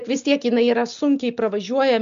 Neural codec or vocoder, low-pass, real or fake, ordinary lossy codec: none; 7.2 kHz; real; MP3, 64 kbps